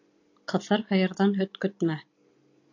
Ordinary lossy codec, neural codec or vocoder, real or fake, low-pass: AAC, 48 kbps; none; real; 7.2 kHz